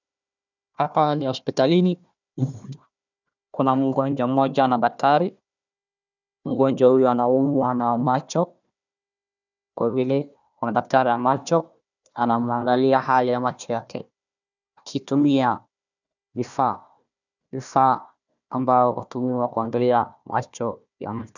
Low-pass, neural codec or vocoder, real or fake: 7.2 kHz; codec, 16 kHz, 1 kbps, FunCodec, trained on Chinese and English, 50 frames a second; fake